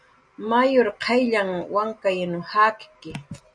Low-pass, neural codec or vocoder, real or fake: 9.9 kHz; none; real